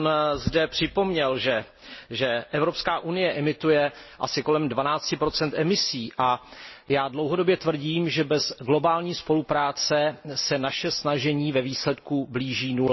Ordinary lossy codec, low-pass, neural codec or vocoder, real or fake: MP3, 24 kbps; 7.2 kHz; none; real